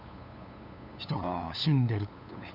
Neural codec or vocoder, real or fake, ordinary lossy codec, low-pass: codec, 16 kHz, 8 kbps, FunCodec, trained on LibriTTS, 25 frames a second; fake; none; 5.4 kHz